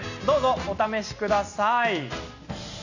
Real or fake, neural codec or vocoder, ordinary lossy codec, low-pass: real; none; none; 7.2 kHz